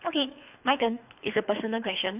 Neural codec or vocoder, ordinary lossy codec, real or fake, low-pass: codec, 24 kHz, 3 kbps, HILCodec; none; fake; 3.6 kHz